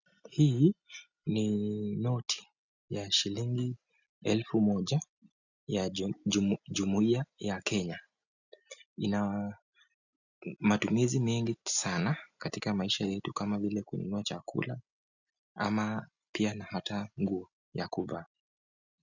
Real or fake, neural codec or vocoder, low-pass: real; none; 7.2 kHz